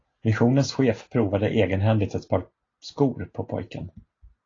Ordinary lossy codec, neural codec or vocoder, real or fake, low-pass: AAC, 32 kbps; none; real; 7.2 kHz